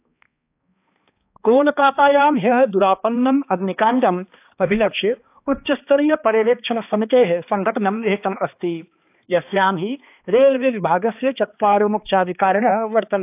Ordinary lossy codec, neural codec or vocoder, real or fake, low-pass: AAC, 32 kbps; codec, 16 kHz, 2 kbps, X-Codec, HuBERT features, trained on balanced general audio; fake; 3.6 kHz